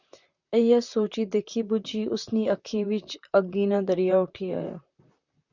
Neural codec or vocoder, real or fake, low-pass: vocoder, 44.1 kHz, 128 mel bands, Pupu-Vocoder; fake; 7.2 kHz